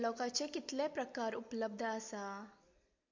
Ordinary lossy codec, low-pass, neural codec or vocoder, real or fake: MP3, 48 kbps; 7.2 kHz; none; real